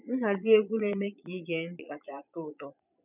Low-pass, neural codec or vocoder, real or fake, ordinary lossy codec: 3.6 kHz; vocoder, 22.05 kHz, 80 mel bands, Vocos; fake; none